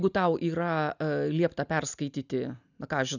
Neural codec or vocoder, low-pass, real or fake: none; 7.2 kHz; real